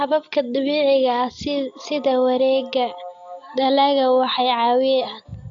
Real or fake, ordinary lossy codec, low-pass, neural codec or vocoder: real; AAC, 48 kbps; 7.2 kHz; none